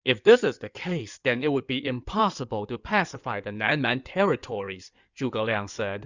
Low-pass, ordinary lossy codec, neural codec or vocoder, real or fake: 7.2 kHz; Opus, 64 kbps; codec, 16 kHz in and 24 kHz out, 2.2 kbps, FireRedTTS-2 codec; fake